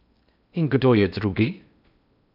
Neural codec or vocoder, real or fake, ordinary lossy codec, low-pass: codec, 16 kHz in and 24 kHz out, 0.6 kbps, FocalCodec, streaming, 2048 codes; fake; none; 5.4 kHz